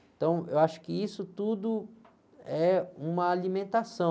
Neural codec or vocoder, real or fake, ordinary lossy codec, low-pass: none; real; none; none